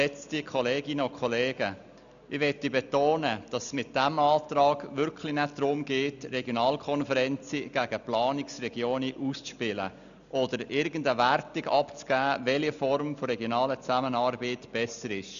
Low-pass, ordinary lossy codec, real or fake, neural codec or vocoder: 7.2 kHz; none; real; none